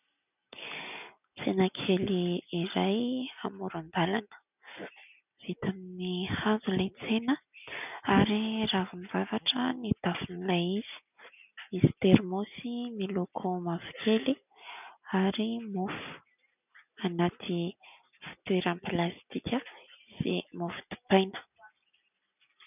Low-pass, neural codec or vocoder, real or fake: 3.6 kHz; none; real